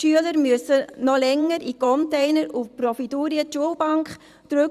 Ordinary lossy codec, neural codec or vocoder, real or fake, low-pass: Opus, 64 kbps; vocoder, 44.1 kHz, 128 mel bands every 512 samples, BigVGAN v2; fake; 14.4 kHz